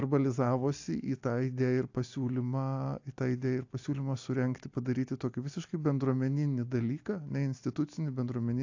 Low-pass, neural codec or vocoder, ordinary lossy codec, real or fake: 7.2 kHz; none; AAC, 48 kbps; real